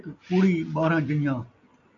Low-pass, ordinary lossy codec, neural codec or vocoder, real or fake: 7.2 kHz; AAC, 64 kbps; none; real